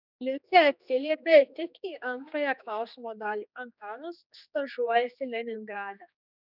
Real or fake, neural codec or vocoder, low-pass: fake; codec, 16 kHz, 2 kbps, X-Codec, HuBERT features, trained on general audio; 5.4 kHz